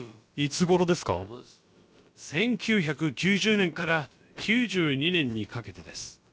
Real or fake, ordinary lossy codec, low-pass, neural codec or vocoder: fake; none; none; codec, 16 kHz, about 1 kbps, DyCAST, with the encoder's durations